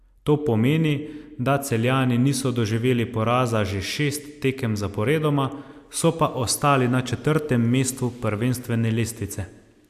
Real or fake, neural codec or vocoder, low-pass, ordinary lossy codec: real; none; 14.4 kHz; AAC, 96 kbps